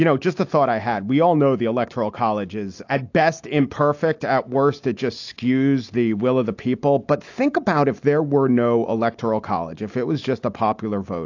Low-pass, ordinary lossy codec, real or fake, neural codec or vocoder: 7.2 kHz; AAC, 48 kbps; real; none